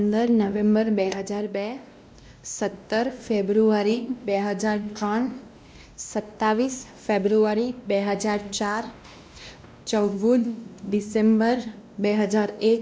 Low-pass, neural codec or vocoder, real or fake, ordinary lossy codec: none; codec, 16 kHz, 1 kbps, X-Codec, WavLM features, trained on Multilingual LibriSpeech; fake; none